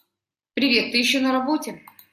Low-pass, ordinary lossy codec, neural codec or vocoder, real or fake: 14.4 kHz; AAC, 96 kbps; none; real